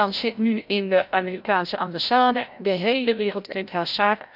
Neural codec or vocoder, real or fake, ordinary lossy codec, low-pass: codec, 16 kHz, 0.5 kbps, FreqCodec, larger model; fake; none; 5.4 kHz